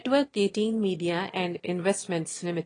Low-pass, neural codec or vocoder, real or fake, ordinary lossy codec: 9.9 kHz; autoencoder, 22.05 kHz, a latent of 192 numbers a frame, VITS, trained on one speaker; fake; AAC, 32 kbps